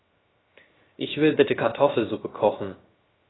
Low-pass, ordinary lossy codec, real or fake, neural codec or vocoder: 7.2 kHz; AAC, 16 kbps; fake; codec, 16 kHz, 0.3 kbps, FocalCodec